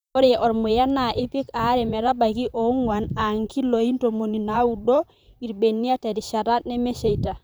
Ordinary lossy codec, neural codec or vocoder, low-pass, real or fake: none; vocoder, 44.1 kHz, 128 mel bands, Pupu-Vocoder; none; fake